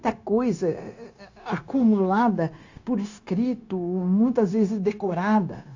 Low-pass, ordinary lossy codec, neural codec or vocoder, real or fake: 7.2 kHz; AAC, 48 kbps; codec, 16 kHz, 0.9 kbps, LongCat-Audio-Codec; fake